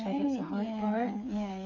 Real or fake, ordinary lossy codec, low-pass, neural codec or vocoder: fake; none; 7.2 kHz; codec, 16 kHz, 8 kbps, FreqCodec, smaller model